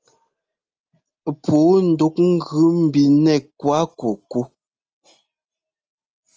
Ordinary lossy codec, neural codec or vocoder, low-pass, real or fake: Opus, 24 kbps; none; 7.2 kHz; real